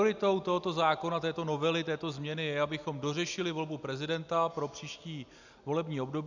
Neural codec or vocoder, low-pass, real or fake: none; 7.2 kHz; real